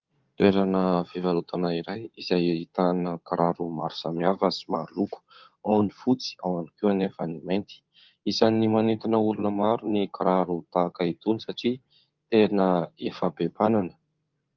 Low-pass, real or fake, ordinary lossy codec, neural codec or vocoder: 7.2 kHz; fake; Opus, 24 kbps; codec, 16 kHz in and 24 kHz out, 2.2 kbps, FireRedTTS-2 codec